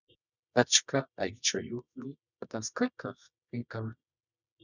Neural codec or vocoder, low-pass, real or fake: codec, 24 kHz, 0.9 kbps, WavTokenizer, medium music audio release; 7.2 kHz; fake